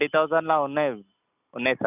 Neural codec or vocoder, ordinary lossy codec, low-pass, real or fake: none; none; 3.6 kHz; real